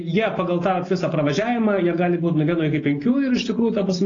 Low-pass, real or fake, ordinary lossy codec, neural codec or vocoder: 7.2 kHz; real; AAC, 32 kbps; none